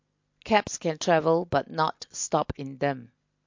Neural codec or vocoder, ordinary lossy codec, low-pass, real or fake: none; MP3, 48 kbps; 7.2 kHz; real